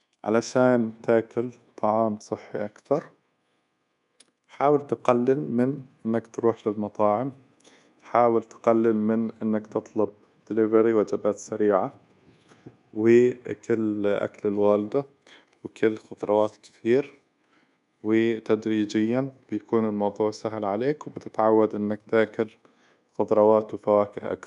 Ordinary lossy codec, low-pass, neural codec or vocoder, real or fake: none; 10.8 kHz; codec, 24 kHz, 1.2 kbps, DualCodec; fake